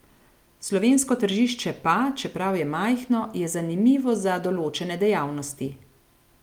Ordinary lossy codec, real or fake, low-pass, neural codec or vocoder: Opus, 32 kbps; real; 19.8 kHz; none